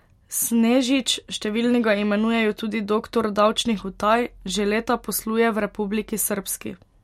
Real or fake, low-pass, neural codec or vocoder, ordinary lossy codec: real; 19.8 kHz; none; MP3, 64 kbps